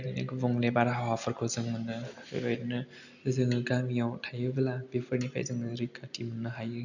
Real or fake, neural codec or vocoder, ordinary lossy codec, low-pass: real; none; none; 7.2 kHz